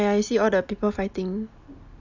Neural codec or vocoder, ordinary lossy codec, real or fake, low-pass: none; none; real; 7.2 kHz